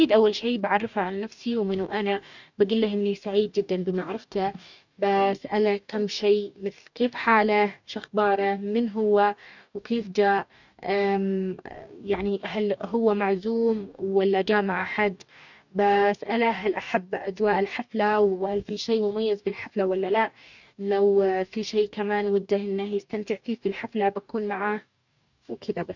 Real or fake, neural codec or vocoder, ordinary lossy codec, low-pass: fake; codec, 44.1 kHz, 2.6 kbps, DAC; none; 7.2 kHz